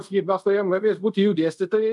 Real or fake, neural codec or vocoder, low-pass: fake; codec, 24 kHz, 0.5 kbps, DualCodec; 10.8 kHz